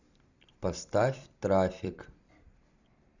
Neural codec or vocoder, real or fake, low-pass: vocoder, 44.1 kHz, 128 mel bands every 256 samples, BigVGAN v2; fake; 7.2 kHz